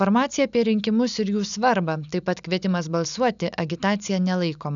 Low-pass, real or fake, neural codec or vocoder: 7.2 kHz; real; none